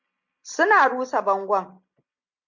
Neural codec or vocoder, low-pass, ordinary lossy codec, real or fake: none; 7.2 kHz; MP3, 48 kbps; real